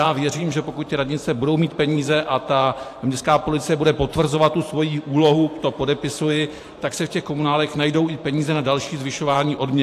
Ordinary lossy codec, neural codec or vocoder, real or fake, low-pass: AAC, 64 kbps; vocoder, 44.1 kHz, 128 mel bands every 512 samples, BigVGAN v2; fake; 14.4 kHz